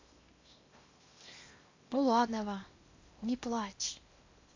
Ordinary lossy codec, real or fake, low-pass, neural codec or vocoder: AAC, 48 kbps; fake; 7.2 kHz; codec, 16 kHz in and 24 kHz out, 0.8 kbps, FocalCodec, streaming, 65536 codes